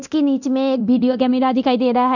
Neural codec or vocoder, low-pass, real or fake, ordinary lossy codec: codec, 24 kHz, 0.9 kbps, DualCodec; 7.2 kHz; fake; none